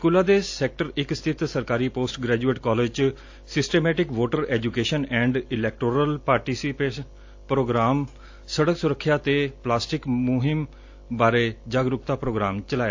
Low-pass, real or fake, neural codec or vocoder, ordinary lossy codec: 7.2 kHz; real; none; AAC, 48 kbps